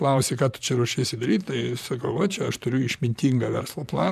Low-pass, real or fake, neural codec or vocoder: 14.4 kHz; fake; vocoder, 44.1 kHz, 128 mel bands, Pupu-Vocoder